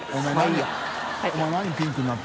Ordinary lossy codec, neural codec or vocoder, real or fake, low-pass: none; none; real; none